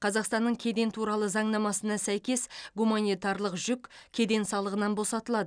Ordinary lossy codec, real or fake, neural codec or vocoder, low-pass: none; real; none; 9.9 kHz